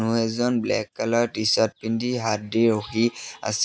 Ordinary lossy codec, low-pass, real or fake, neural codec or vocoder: none; none; real; none